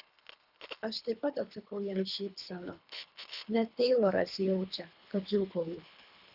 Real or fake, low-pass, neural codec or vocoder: fake; 5.4 kHz; codec, 24 kHz, 3 kbps, HILCodec